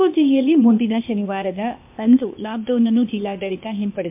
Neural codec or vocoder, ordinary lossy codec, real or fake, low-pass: codec, 16 kHz, 0.8 kbps, ZipCodec; MP3, 32 kbps; fake; 3.6 kHz